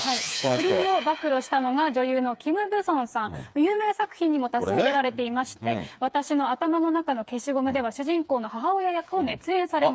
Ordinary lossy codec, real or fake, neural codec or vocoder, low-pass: none; fake; codec, 16 kHz, 4 kbps, FreqCodec, smaller model; none